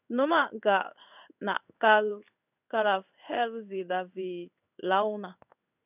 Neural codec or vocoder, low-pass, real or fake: codec, 16 kHz in and 24 kHz out, 1 kbps, XY-Tokenizer; 3.6 kHz; fake